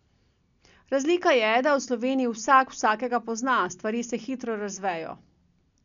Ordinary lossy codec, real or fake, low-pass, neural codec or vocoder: none; real; 7.2 kHz; none